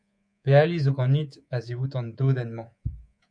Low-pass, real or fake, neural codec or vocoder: 9.9 kHz; fake; codec, 24 kHz, 3.1 kbps, DualCodec